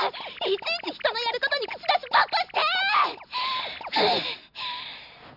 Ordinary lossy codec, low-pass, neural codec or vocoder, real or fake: none; 5.4 kHz; none; real